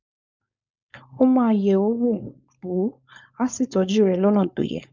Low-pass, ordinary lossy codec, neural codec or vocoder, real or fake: 7.2 kHz; none; codec, 16 kHz, 4.8 kbps, FACodec; fake